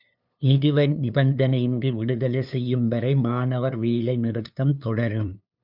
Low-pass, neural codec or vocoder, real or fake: 5.4 kHz; codec, 16 kHz, 2 kbps, FunCodec, trained on LibriTTS, 25 frames a second; fake